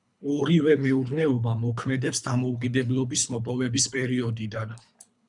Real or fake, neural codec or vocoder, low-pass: fake; codec, 24 kHz, 3 kbps, HILCodec; 10.8 kHz